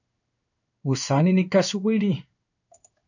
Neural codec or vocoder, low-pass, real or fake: codec, 16 kHz in and 24 kHz out, 1 kbps, XY-Tokenizer; 7.2 kHz; fake